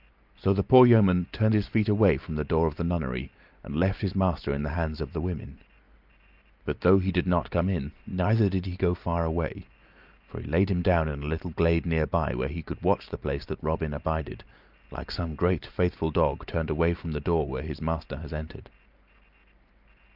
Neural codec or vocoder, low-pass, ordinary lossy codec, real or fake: none; 5.4 kHz; Opus, 24 kbps; real